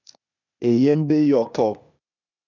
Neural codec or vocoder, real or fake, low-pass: codec, 16 kHz, 0.8 kbps, ZipCodec; fake; 7.2 kHz